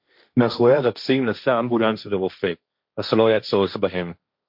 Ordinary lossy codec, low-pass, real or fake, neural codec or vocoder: MP3, 48 kbps; 5.4 kHz; fake; codec, 16 kHz, 1.1 kbps, Voila-Tokenizer